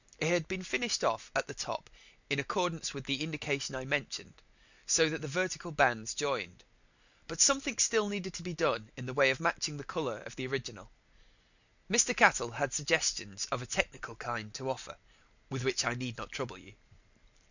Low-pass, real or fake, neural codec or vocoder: 7.2 kHz; real; none